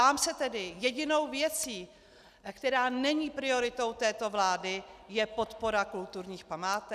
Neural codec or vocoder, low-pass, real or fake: none; 14.4 kHz; real